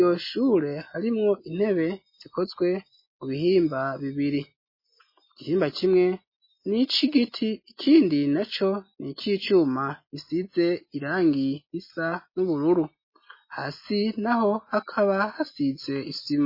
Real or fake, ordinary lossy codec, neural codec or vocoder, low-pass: real; MP3, 24 kbps; none; 5.4 kHz